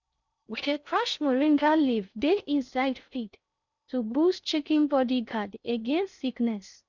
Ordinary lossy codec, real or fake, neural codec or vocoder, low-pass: none; fake; codec, 16 kHz in and 24 kHz out, 0.6 kbps, FocalCodec, streaming, 4096 codes; 7.2 kHz